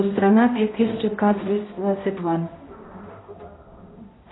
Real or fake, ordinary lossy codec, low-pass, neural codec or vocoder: fake; AAC, 16 kbps; 7.2 kHz; codec, 16 kHz, 0.5 kbps, X-Codec, HuBERT features, trained on balanced general audio